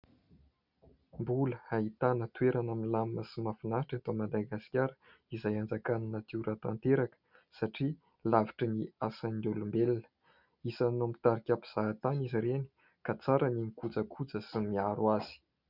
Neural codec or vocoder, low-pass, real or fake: none; 5.4 kHz; real